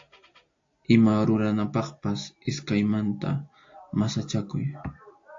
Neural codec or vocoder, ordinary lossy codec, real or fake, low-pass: none; AAC, 48 kbps; real; 7.2 kHz